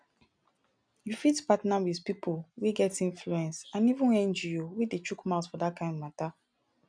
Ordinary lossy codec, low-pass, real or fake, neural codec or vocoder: none; 9.9 kHz; real; none